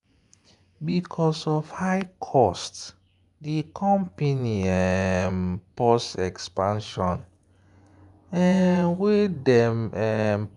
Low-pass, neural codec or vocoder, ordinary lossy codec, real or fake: 10.8 kHz; vocoder, 24 kHz, 100 mel bands, Vocos; none; fake